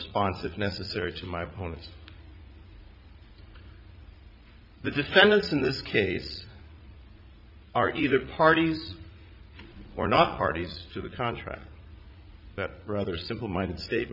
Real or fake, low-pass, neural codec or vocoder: fake; 5.4 kHz; vocoder, 44.1 kHz, 80 mel bands, Vocos